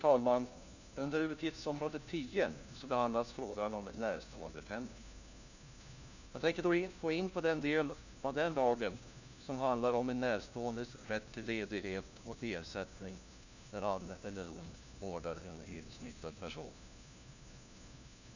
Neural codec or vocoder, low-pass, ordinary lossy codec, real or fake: codec, 16 kHz, 1 kbps, FunCodec, trained on LibriTTS, 50 frames a second; 7.2 kHz; none; fake